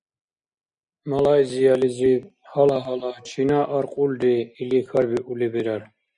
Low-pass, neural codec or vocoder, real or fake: 9.9 kHz; none; real